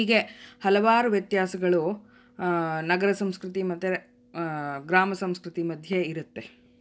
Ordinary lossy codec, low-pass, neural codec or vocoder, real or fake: none; none; none; real